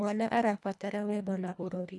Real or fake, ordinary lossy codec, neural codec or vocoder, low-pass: fake; none; codec, 24 kHz, 1.5 kbps, HILCodec; none